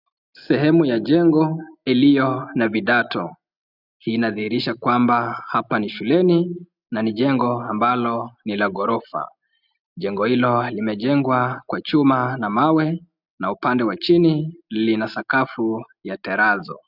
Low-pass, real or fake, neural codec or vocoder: 5.4 kHz; real; none